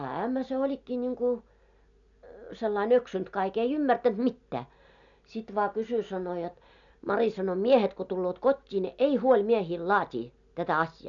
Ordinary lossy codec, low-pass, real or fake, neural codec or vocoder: none; 7.2 kHz; real; none